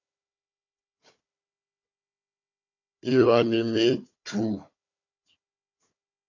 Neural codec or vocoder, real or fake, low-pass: codec, 16 kHz, 4 kbps, FunCodec, trained on Chinese and English, 50 frames a second; fake; 7.2 kHz